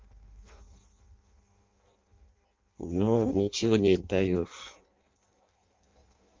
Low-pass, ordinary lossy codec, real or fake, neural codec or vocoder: 7.2 kHz; Opus, 32 kbps; fake; codec, 16 kHz in and 24 kHz out, 0.6 kbps, FireRedTTS-2 codec